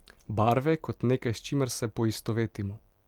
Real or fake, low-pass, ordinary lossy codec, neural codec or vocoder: fake; 19.8 kHz; Opus, 32 kbps; vocoder, 44.1 kHz, 128 mel bands every 256 samples, BigVGAN v2